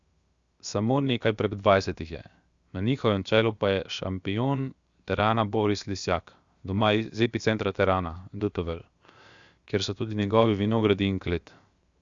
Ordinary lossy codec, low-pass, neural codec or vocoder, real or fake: Opus, 64 kbps; 7.2 kHz; codec, 16 kHz, 0.7 kbps, FocalCodec; fake